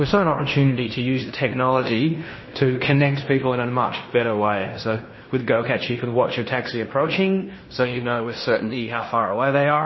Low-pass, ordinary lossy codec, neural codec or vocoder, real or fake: 7.2 kHz; MP3, 24 kbps; codec, 16 kHz in and 24 kHz out, 0.9 kbps, LongCat-Audio-Codec, fine tuned four codebook decoder; fake